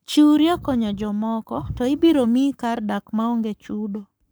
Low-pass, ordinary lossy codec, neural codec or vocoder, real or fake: none; none; codec, 44.1 kHz, 7.8 kbps, Pupu-Codec; fake